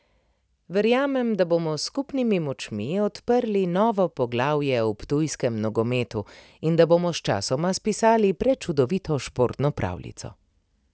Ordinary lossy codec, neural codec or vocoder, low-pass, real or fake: none; none; none; real